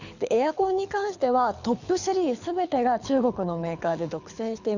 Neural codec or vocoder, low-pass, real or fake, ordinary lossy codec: codec, 24 kHz, 6 kbps, HILCodec; 7.2 kHz; fake; none